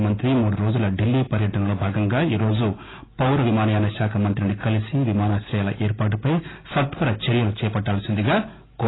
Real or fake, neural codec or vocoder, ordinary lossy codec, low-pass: real; none; AAC, 16 kbps; 7.2 kHz